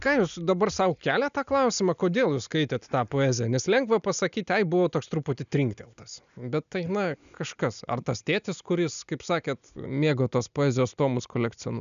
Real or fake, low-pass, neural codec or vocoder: real; 7.2 kHz; none